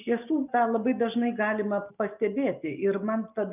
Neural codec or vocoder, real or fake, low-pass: none; real; 3.6 kHz